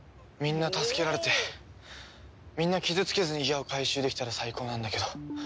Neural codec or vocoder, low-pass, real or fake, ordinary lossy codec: none; none; real; none